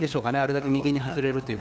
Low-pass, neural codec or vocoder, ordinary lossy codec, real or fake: none; codec, 16 kHz, 2 kbps, FunCodec, trained on LibriTTS, 25 frames a second; none; fake